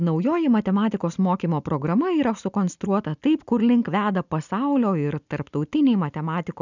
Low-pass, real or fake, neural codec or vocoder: 7.2 kHz; real; none